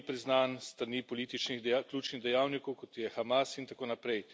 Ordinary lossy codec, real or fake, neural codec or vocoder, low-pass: none; real; none; none